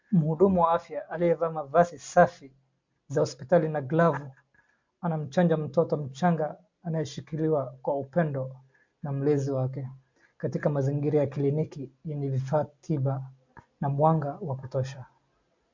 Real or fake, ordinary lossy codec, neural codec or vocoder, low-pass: real; MP3, 48 kbps; none; 7.2 kHz